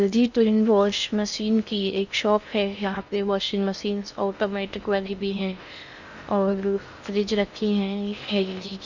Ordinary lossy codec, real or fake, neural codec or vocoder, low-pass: none; fake; codec, 16 kHz in and 24 kHz out, 0.6 kbps, FocalCodec, streaming, 2048 codes; 7.2 kHz